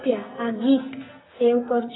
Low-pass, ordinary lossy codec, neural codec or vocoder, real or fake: 7.2 kHz; AAC, 16 kbps; codec, 44.1 kHz, 2.6 kbps, SNAC; fake